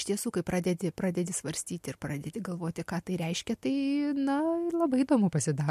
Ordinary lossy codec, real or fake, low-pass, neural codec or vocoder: MP3, 64 kbps; real; 14.4 kHz; none